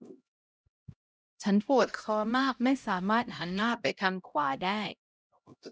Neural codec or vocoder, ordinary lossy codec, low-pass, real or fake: codec, 16 kHz, 0.5 kbps, X-Codec, HuBERT features, trained on LibriSpeech; none; none; fake